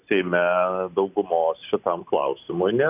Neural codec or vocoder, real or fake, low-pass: none; real; 3.6 kHz